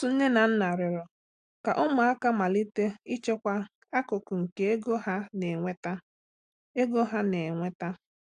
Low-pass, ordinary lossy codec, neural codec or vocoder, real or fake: 9.9 kHz; AAC, 64 kbps; none; real